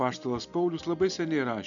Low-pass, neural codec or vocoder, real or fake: 7.2 kHz; none; real